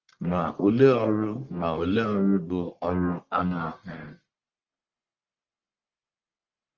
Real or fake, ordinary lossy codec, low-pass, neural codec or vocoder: fake; Opus, 24 kbps; 7.2 kHz; codec, 44.1 kHz, 1.7 kbps, Pupu-Codec